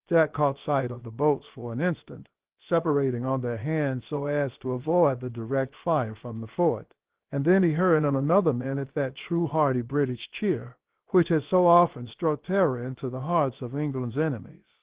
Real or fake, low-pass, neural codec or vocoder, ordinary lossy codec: fake; 3.6 kHz; codec, 16 kHz, about 1 kbps, DyCAST, with the encoder's durations; Opus, 16 kbps